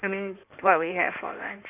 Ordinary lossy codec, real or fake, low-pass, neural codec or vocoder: none; fake; 3.6 kHz; codec, 16 kHz in and 24 kHz out, 2.2 kbps, FireRedTTS-2 codec